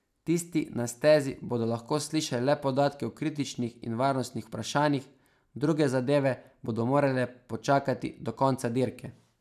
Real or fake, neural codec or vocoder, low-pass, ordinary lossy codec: real; none; 14.4 kHz; none